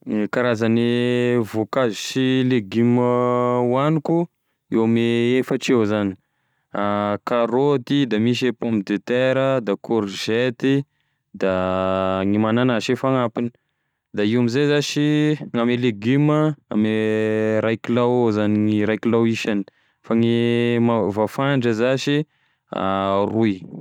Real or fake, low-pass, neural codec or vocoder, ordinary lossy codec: real; 19.8 kHz; none; none